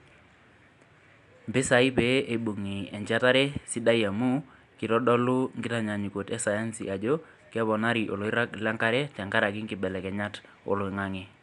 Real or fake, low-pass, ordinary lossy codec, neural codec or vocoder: real; 10.8 kHz; none; none